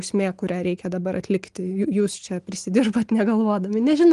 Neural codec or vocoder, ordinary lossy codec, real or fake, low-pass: none; Opus, 24 kbps; real; 10.8 kHz